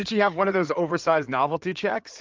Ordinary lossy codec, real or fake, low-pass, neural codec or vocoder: Opus, 24 kbps; fake; 7.2 kHz; codec, 16 kHz in and 24 kHz out, 2.2 kbps, FireRedTTS-2 codec